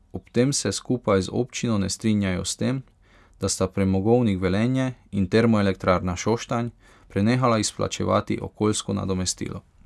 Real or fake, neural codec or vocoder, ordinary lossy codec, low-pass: real; none; none; none